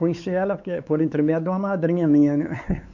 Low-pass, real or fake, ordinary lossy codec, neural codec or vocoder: 7.2 kHz; fake; none; codec, 16 kHz, 4 kbps, X-Codec, WavLM features, trained on Multilingual LibriSpeech